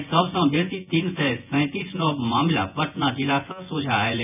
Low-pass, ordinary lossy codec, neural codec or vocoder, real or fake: 3.6 kHz; none; vocoder, 24 kHz, 100 mel bands, Vocos; fake